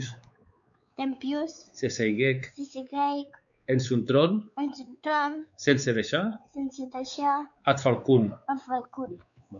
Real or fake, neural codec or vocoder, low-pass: fake; codec, 16 kHz, 4 kbps, X-Codec, WavLM features, trained on Multilingual LibriSpeech; 7.2 kHz